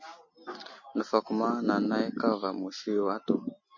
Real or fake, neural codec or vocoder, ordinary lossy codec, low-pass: real; none; MP3, 32 kbps; 7.2 kHz